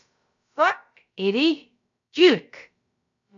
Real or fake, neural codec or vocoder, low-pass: fake; codec, 16 kHz, about 1 kbps, DyCAST, with the encoder's durations; 7.2 kHz